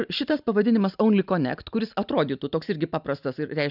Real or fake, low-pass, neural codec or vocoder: real; 5.4 kHz; none